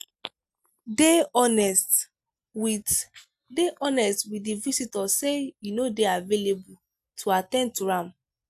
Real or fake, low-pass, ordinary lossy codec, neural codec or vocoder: real; 14.4 kHz; AAC, 96 kbps; none